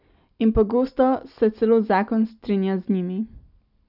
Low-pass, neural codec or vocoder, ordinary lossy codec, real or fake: 5.4 kHz; none; none; real